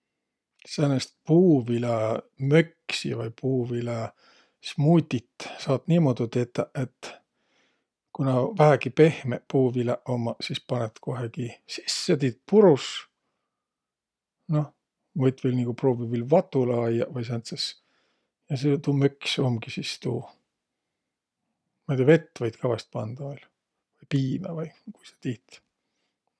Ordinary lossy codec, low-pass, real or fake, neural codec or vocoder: none; none; real; none